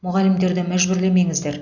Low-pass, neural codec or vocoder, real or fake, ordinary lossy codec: 7.2 kHz; none; real; none